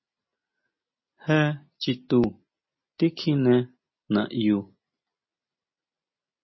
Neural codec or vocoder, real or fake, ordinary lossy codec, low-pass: none; real; MP3, 24 kbps; 7.2 kHz